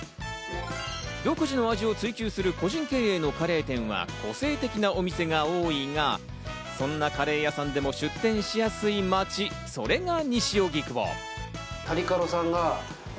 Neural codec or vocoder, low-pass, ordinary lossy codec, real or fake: none; none; none; real